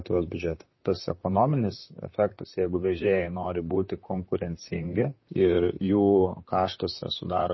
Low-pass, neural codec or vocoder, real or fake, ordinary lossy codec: 7.2 kHz; vocoder, 44.1 kHz, 128 mel bands, Pupu-Vocoder; fake; MP3, 24 kbps